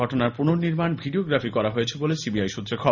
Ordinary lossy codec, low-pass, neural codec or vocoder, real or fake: none; 7.2 kHz; none; real